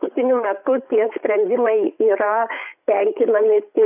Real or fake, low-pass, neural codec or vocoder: fake; 3.6 kHz; codec, 16 kHz, 8 kbps, FreqCodec, larger model